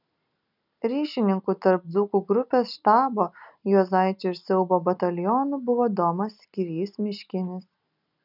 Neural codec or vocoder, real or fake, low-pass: none; real; 5.4 kHz